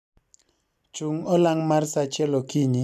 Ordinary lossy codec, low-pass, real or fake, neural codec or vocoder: none; 14.4 kHz; real; none